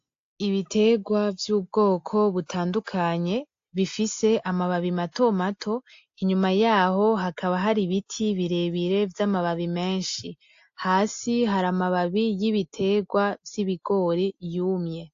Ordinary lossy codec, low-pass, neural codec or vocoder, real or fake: AAC, 48 kbps; 7.2 kHz; none; real